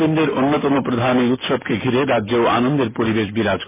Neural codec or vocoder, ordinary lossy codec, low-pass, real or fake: none; MP3, 16 kbps; 3.6 kHz; real